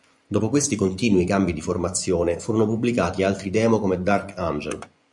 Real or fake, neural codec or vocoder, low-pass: fake; vocoder, 24 kHz, 100 mel bands, Vocos; 10.8 kHz